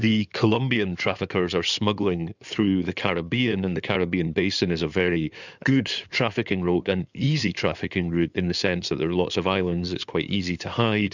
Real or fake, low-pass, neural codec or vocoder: fake; 7.2 kHz; codec, 16 kHz in and 24 kHz out, 2.2 kbps, FireRedTTS-2 codec